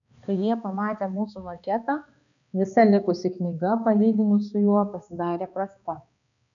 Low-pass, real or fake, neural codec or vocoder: 7.2 kHz; fake; codec, 16 kHz, 2 kbps, X-Codec, HuBERT features, trained on balanced general audio